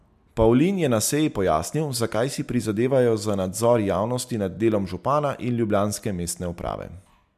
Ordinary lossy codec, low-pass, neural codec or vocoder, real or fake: MP3, 96 kbps; 14.4 kHz; none; real